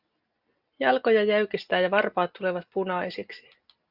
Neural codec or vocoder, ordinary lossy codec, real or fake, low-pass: none; Opus, 64 kbps; real; 5.4 kHz